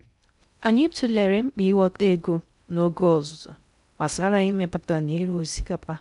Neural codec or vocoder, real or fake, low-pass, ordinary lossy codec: codec, 16 kHz in and 24 kHz out, 0.6 kbps, FocalCodec, streaming, 2048 codes; fake; 10.8 kHz; none